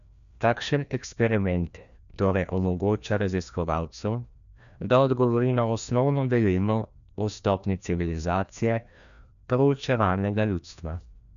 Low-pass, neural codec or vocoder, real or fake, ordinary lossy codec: 7.2 kHz; codec, 16 kHz, 1 kbps, FreqCodec, larger model; fake; none